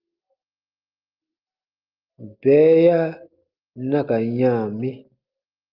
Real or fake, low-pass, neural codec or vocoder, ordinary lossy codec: real; 5.4 kHz; none; Opus, 24 kbps